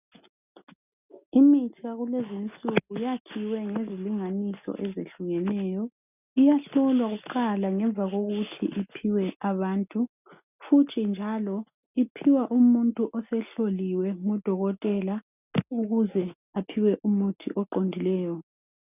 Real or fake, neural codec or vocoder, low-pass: real; none; 3.6 kHz